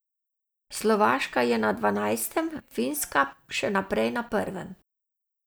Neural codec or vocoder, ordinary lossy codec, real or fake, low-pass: none; none; real; none